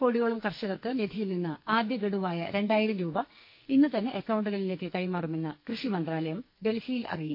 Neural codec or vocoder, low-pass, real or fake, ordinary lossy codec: codec, 44.1 kHz, 2.6 kbps, SNAC; 5.4 kHz; fake; MP3, 32 kbps